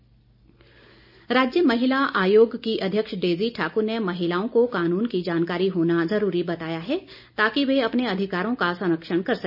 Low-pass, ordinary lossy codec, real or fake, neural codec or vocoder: 5.4 kHz; none; real; none